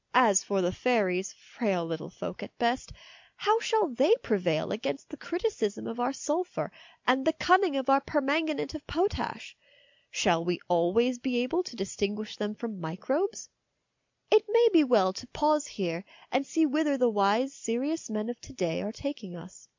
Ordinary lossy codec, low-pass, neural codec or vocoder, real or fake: MP3, 64 kbps; 7.2 kHz; none; real